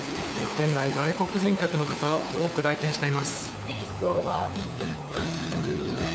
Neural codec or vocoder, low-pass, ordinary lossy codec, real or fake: codec, 16 kHz, 4 kbps, FunCodec, trained on LibriTTS, 50 frames a second; none; none; fake